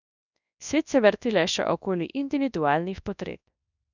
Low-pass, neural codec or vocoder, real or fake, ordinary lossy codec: 7.2 kHz; codec, 24 kHz, 0.9 kbps, WavTokenizer, large speech release; fake; none